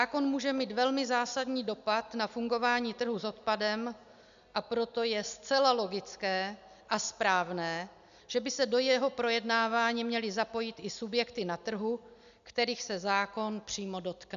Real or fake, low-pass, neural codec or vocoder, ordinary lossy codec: real; 7.2 kHz; none; AAC, 96 kbps